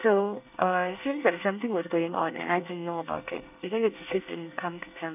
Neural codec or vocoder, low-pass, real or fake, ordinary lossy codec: codec, 24 kHz, 1 kbps, SNAC; 3.6 kHz; fake; none